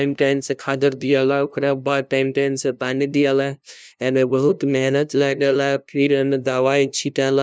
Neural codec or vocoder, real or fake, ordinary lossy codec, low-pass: codec, 16 kHz, 0.5 kbps, FunCodec, trained on LibriTTS, 25 frames a second; fake; none; none